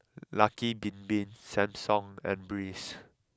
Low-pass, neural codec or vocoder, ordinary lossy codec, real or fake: none; none; none; real